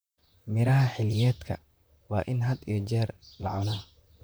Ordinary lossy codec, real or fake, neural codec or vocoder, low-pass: none; fake; vocoder, 44.1 kHz, 128 mel bands, Pupu-Vocoder; none